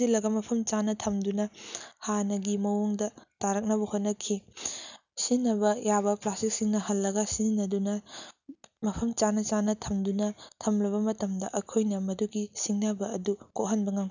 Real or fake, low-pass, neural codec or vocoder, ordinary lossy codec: real; 7.2 kHz; none; none